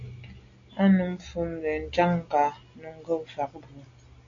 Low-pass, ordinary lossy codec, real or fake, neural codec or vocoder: 7.2 kHz; AAC, 48 kbps; real; none